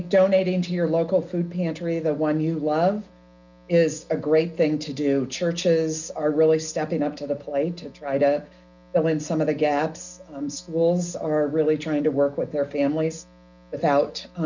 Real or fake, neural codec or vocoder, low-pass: real; none; 7.2 kHz